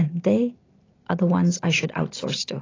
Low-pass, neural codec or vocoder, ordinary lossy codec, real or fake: 7.2 kHz; none; AAC, 32 kbps; real